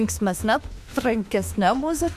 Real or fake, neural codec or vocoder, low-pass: fake; autoencoder, 48 kHz, 32 numbers a frame, DAC-VAE, trained on Japanese speech; 14.4 kHz